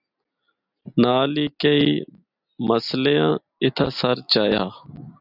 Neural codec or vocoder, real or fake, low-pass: none; real; 5.4 kHz